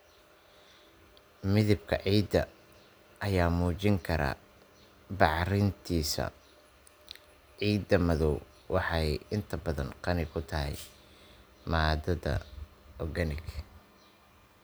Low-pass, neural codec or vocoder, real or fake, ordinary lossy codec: none; none; real; none